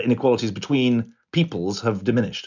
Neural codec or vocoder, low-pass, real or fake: none; 7.2 kHz; real